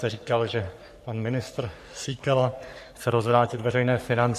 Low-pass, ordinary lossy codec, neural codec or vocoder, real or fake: 14.4 kHz; MP3, 64 kbps; codec, 44.1 kHz, 3.4 kbps, Pupu-Codec; fake